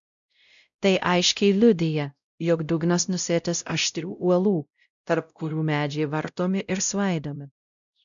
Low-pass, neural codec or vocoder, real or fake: 7.2 kHz; codec, 16 kHz, 0.5 kbps, X-Codec, WavLM features, trained on Multilingual LibriSpeech; fake